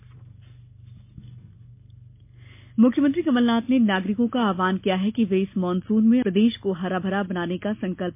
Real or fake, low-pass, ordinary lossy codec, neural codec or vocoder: real; 3.6 kHz; MP3, 24 kbps; none